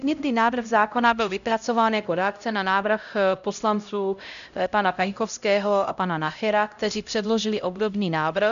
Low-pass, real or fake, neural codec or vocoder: 7.2 kHz; fake; codec, 16 kHz, 0.5 kbps, X-Codec, HuBERT features, trained on LibriSpeech